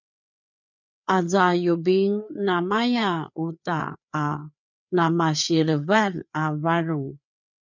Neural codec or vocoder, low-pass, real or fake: codec, 16 kHz, 4 kbps, FreqCodec, larger model; 7.2 kHz; fake